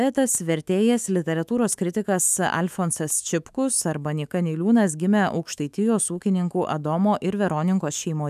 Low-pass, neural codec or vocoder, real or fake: 14.4 kHz; autoencoder, 48 kHz, 128 numbers a frame, DAC-VAE, trained on Japanese speech; fake